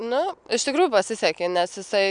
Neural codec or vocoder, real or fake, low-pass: none; real; 9.9 kHz